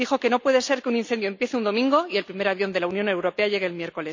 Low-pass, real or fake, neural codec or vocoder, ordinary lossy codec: 7.2 kHz; real; none; MP3, 64 kbps